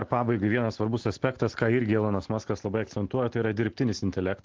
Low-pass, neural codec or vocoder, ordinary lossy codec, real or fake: 7.2 kHz; none; Opus, 16 kbps; real